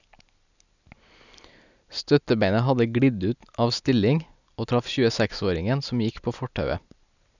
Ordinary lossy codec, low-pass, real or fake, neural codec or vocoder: none; 7.2 kHz; real; none